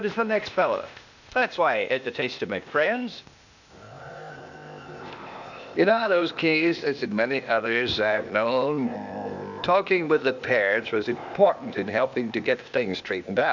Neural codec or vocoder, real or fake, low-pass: codec, 16 kHz, 0.8 kbps, ZipCodec; fake; 7.2 kHz